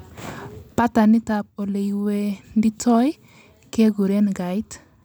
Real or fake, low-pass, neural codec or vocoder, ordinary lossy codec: real; none; none; none